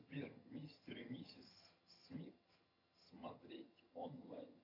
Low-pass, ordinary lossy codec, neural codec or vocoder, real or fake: 5.4 kHz; AAC, 48 kbps; vocoder, 22.05 kHz, 80 mel bands, HiFi-GAN; fake